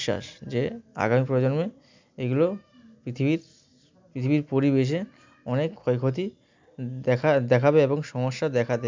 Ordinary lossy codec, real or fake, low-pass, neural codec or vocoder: MP3, 64 kbps; real; 7.2 kHz; none